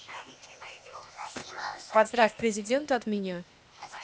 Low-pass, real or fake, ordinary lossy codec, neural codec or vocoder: none; fake; none; codec, 16 kHz, 0.8 kbps, ZipCodec